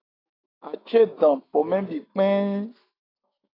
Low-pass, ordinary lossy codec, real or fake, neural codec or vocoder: 5.4 kHz; AAC, 24 kbps; fake; autoencoder, 48 kHz, 128 numbers a frame, DAC-VAE, trained on Japanese speech